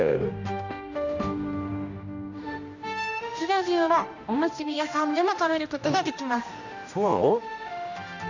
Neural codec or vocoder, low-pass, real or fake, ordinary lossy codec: codec, 16 kHz, 1 kbps, X-Codec, HuBERT features, trained on general audio; 7.2 kHz; fake; none